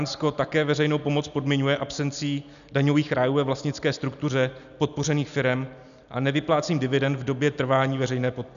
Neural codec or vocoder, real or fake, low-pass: none; real; 7.2 kHz